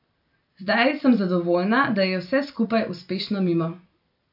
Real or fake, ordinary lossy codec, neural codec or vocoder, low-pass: fake; AAC, 48 kbps; vocoder, 44.1 kHz, 128 mel bands every 256 samples, BigVGAN v2; 5.4 kHz